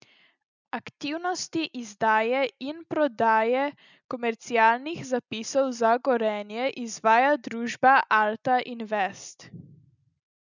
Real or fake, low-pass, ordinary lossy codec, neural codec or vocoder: real; 7.2 kHz; none; none